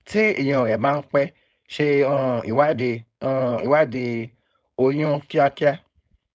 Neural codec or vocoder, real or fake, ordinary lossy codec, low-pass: codec, 16 kHz, 4.8 kbps, FACodec; fake; none; none